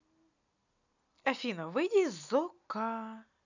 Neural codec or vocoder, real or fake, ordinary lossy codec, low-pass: none; real; none; 7.2 kHz